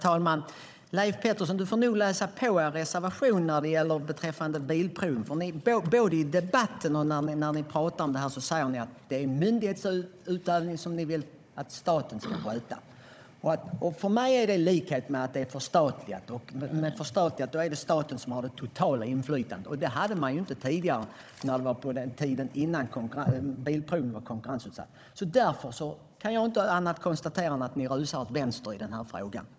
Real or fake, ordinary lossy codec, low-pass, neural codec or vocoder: fake; none; none; codec, 16 kHz, 16 kbps, FunCodec, trained on Chinese and English, 50 frames a second